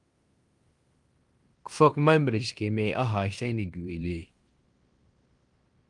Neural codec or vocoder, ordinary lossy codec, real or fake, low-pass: codec, 16 kHz in and 24 kHz out, 0.9 kbps, LongCat-Audio-Codec, fine tuned four codebook decoder; Opus, 32 kbps; fake; 10.8 kHz